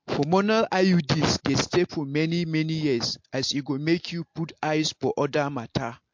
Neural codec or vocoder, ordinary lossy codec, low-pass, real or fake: none; MP3, 48 kbps; 7.2 kHz; real